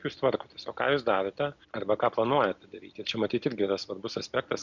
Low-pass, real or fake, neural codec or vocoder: 7.2 kHz; real; none